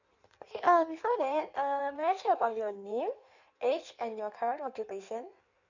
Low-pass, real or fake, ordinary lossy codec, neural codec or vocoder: 7.2 kHz; fake; none; codec, 16 kHz in and 24 kHz out, 1.1 kbps, FireRedTTS-2 codec